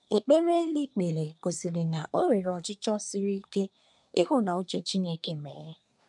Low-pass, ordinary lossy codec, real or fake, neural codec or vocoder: 10.8 kHz; none; fake; codec, 24 kHz, 1 kbps, SNAC